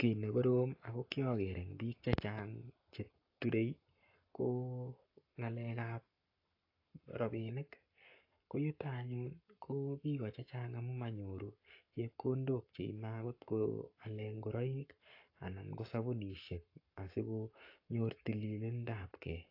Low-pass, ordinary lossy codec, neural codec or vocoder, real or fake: 5.4 kHz; AAC, 32 kbps; codec, 44.1 kHz, 7.8 kbps, Pupu-Codec; fake